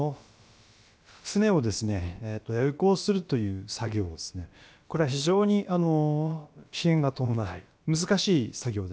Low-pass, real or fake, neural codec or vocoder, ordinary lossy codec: none; fake; codec, 16 kHz, about 1 kbps, DyCAST, with the encoder's durations; none